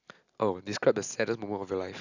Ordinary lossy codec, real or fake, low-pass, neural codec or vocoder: none; real; 7.2 kHz; none